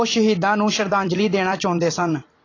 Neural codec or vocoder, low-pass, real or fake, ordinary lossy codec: vocoder, 44.1 kHz, 128 mel bands every 256 samples, BigVGAN v2; 7.2 kHz; fake; AAC, 32 kbps